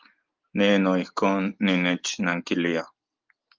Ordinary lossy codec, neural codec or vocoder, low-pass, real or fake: Opus, 32 kbps; none; 7.2 kHz; real